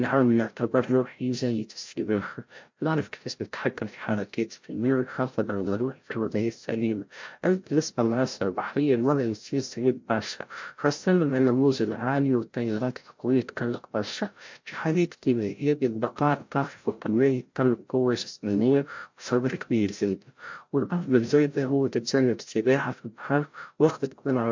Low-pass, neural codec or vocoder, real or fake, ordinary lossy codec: 7.2 kHz; codec, 16 kHz, 0.5 kbps, FreqCodec, larger model; fake; MP3, 48 kbps